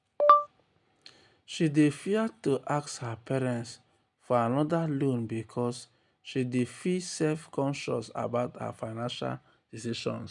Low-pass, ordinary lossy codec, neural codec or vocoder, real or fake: 10.8 kHz; none; none; real